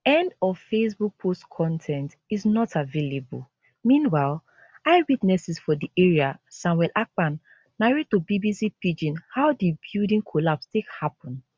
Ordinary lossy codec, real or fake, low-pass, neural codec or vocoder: none; real; none; none